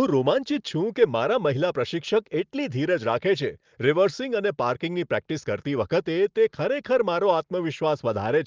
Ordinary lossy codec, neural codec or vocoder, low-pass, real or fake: Opus, 24 kbps; none; 7.2 kHz; real